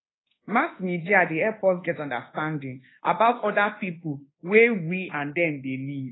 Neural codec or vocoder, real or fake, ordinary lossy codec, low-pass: codec, 24 kHz, 1.2 kbps, DualCodec; fake; AAC, 16 kbps; 7.2 kHz